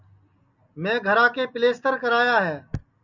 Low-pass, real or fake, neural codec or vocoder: 7.2 kHz; real; none